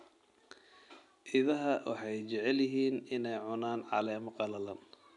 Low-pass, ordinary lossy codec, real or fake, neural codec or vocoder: 10.8 kHz; none; real; none